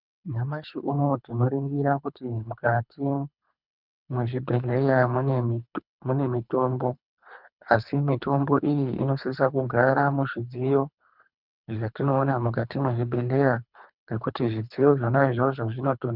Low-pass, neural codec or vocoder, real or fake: 5.4 kHz; codec, 24 kHz, 3 kbps, HILCodec; fake